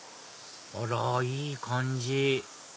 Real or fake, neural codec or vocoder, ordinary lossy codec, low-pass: real; none; none; none